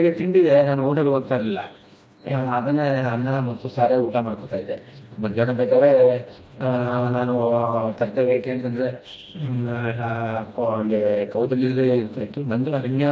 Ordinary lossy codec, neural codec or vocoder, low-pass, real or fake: none; codec, 16 kHz, 1 kbps, FreqCodec, smaller model; none; fake